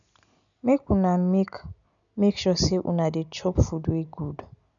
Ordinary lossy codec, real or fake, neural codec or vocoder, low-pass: none; real; none; 7.2 kHz